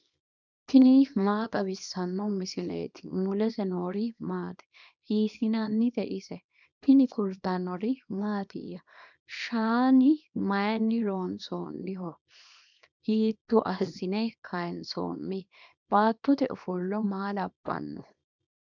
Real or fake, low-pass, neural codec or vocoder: fake; 7.2 kHz; codec, 24 kHz, 0.9 kbps, WavTokenizer, small release